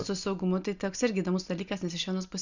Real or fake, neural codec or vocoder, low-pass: real; none; 7.2 kHz